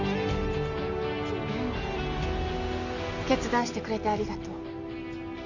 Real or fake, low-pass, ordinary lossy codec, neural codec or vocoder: real; 7.2 kHz; none; none